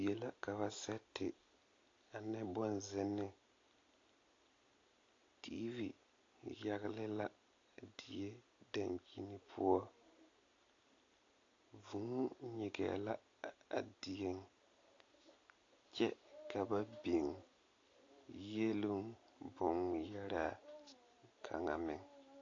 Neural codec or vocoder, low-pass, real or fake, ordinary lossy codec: none; 7.2 kHz; real; AAC, 32 kbps